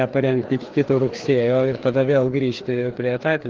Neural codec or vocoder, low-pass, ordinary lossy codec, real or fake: codec, 16 kHz, 2 kbps, FreqCodec, larger model; 7.2 kHz; Opus, 16 kbps; fake